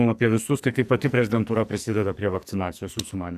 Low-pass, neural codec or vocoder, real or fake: 14.4 kHz; codec, 44.1 kHz, 2.6 kbps, SNAC; fake